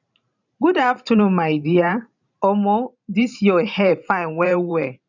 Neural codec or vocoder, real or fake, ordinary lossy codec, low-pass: vocoder, 44.1 kHz, 128 mel bands every 512 samples, BigVGAN v2; fake; none; 7.2 kHz